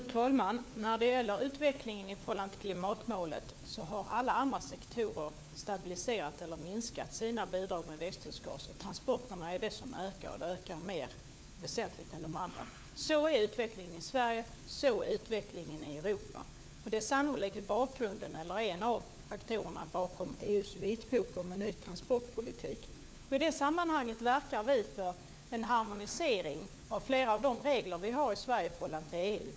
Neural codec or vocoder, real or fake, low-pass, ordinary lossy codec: codec, 16 kHz, 4 kbps, FunCodec, trained on LibriTTS, 50 frames a second; fake; none; none